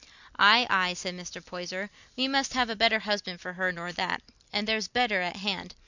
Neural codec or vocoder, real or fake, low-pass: none; real; 7.2 kHz